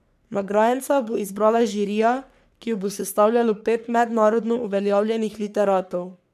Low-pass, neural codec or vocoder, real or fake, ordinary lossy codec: 14.4 kHz; codec, 44.1 kHz, 3.4 kbps, Pupu-Codec; fake; none